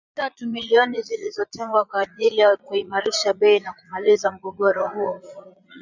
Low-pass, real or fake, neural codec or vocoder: 7.2 kHz; fake; vocoder, 22.05 kHz, 80 mel bands, Vocos